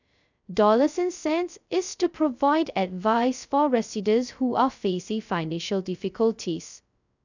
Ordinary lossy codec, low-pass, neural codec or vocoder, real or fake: none; 7.2 kHz; codec, 16 kHz, 0.2 kbps, FocalCodec; fake